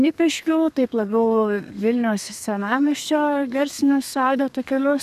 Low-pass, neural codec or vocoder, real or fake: 14.4 kHz; codec, 32 kHz, 1.9 kbps, SNAC; fake